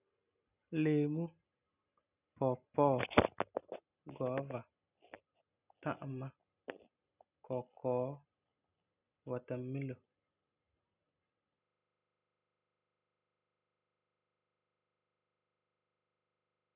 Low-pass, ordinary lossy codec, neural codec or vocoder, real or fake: 3.6 kHz; none; none; real